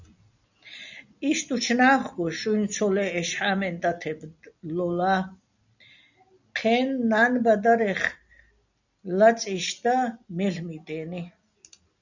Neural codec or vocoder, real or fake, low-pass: none; real; 7.2 kHz